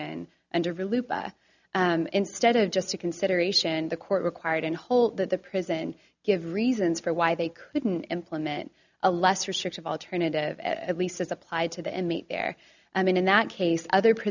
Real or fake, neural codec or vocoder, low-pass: real; none; 7.2 kHz